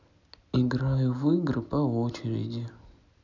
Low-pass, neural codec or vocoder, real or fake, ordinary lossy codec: 7.2 kHz; none; real; none